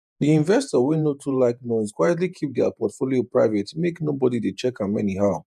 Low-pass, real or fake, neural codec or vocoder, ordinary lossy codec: 14.4 kHz; fake; vocoder, 48 kHz, 128 mel bands, Vocos; none